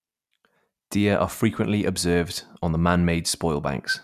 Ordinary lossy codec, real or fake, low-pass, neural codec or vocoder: none; real; 14.4 kHz; none